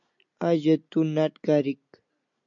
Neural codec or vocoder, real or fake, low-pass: none; real; 7.2 kHz